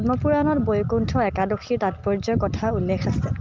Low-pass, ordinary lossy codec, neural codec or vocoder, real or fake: 7.2 kHz; Opus, 32 kbps; none; real